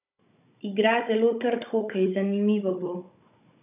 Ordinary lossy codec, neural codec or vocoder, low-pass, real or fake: none; codec, 16 kHz, 16 kbps, FunCodec, trained on Chinese and English, 50 frames a second; 3.6 kHz; fake